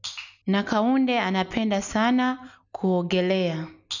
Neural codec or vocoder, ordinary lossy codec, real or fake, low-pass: none; none; real; 7.2 kHz